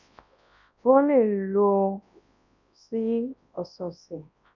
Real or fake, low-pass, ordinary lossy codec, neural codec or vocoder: fake; 7.2 kHz; none; codec, 24 kHz, 0.9 kbps, WavTokenizer, large speech release